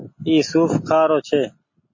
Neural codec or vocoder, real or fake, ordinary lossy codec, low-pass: none; real; MP3, 32 kbps; 7.2 kHz